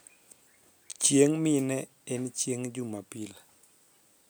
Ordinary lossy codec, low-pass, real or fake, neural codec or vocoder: none; none; real; none